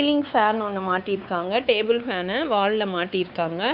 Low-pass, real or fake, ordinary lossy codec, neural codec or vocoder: 5.4 kHz; fake; Opus, 64 kbps; codec, 16 kHz, 4 kbps, X-Codec, WavLM features, trained on Multilingual LibriSpeech